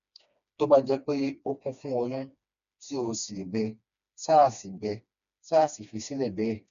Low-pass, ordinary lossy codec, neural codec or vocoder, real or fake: 7.2 kHz; none; codec, 16 kHz, 2 kbps, FreqCodec, smaller model; fake